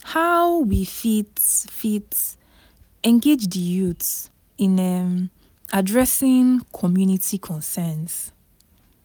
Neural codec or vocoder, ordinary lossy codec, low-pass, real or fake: none; none; none; real